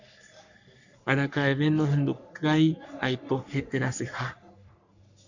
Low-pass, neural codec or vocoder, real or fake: 7.2 kHz; codec, 44.1 kHz, 3.4 kbps, Pupu-Codec; fake